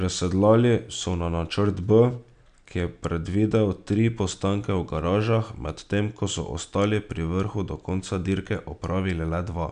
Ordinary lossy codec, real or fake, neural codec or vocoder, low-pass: none; real; none; 9.9 kHz